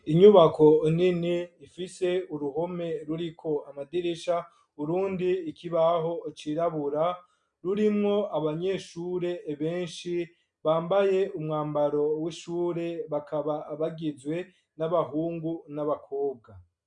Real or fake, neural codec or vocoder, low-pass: real; none; 9.9 kHz